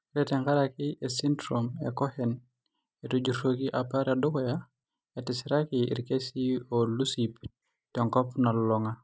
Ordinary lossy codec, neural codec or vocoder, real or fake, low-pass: none; none; real; none